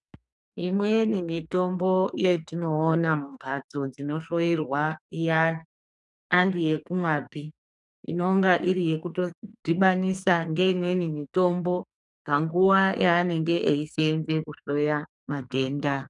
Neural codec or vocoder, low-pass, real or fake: codec, 44.1 kHz, 2.6 kbps, SNAC; 10.8 kHz; fake